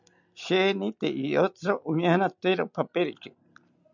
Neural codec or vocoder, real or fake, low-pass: none; real; 7.2 kHz